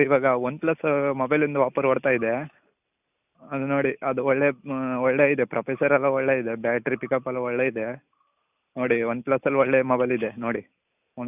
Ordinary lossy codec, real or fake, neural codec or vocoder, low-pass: none; real; none; 3.6 kHz